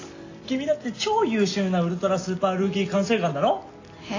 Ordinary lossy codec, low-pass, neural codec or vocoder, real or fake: AAC, 32 kbps; 7.2 kHz; none; real